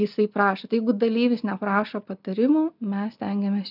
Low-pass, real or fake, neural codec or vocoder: 5.4 kHz; real; none